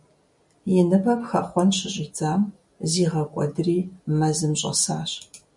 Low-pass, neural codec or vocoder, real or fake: 10.8 kHz; none; real